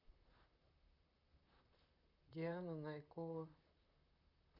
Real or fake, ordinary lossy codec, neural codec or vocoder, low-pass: fake; none; vocoder, 44.1 kHz, 128 mel bands, Pupu-Vocoder; 5.4 kHz